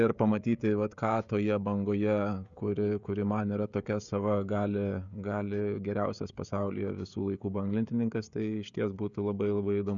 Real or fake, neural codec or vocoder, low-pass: fake; codec, 16 kHz, 16 kbps, FreqCodec, smaller model; 7.2 kHz